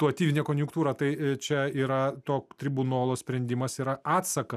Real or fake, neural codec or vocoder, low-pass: real; none; 14.4 kHz